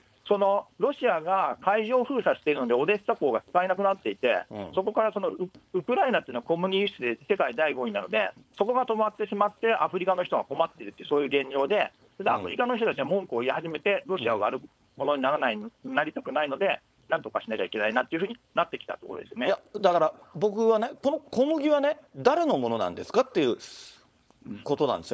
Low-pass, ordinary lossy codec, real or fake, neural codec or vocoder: none; none; fake; codec, 16 kHz, 4.8 kbps, FACodec